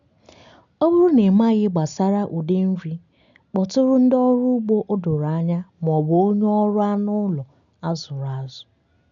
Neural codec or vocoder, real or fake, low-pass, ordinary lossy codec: none; real; 7.2 kHz; none